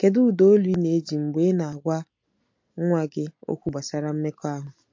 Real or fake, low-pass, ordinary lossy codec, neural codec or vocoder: real; 7.2 kHz; MP3, 48 kbps; none